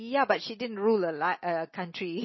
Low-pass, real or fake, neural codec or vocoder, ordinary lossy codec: 7.2 kHz; real; none; MP3, 24 kbps